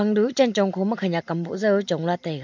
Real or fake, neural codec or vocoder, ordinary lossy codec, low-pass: real; none; MP3, 48 kbps; 7.2 kHz